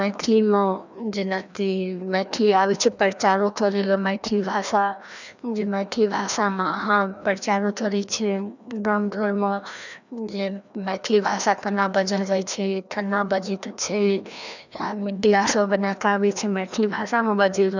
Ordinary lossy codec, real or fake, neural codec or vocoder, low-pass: none; fake; codec, 16 kHz, 1 kbps, FreqCodec, larger model; 7.2 kHz